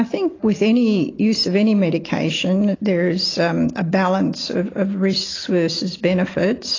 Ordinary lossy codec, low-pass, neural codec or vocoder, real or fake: AAC, 32 kbps; 7.2 kHz; none; real